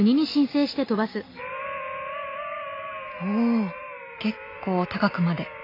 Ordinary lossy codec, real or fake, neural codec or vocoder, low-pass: AAC, 32 kbps; real; none; 5.4 kHz